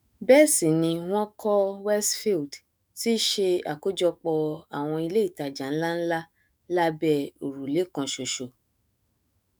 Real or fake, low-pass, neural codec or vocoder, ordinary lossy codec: fake; none; autoencoder, 48 kHz, 128 numbers a frame, DAC-VAE, trained on Japanese speech; none